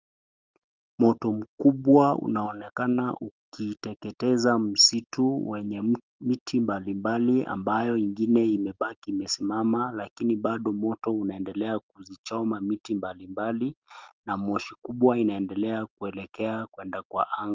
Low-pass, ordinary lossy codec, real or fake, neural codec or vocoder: 7.2 kHz; Opus, 24 kbps; real; none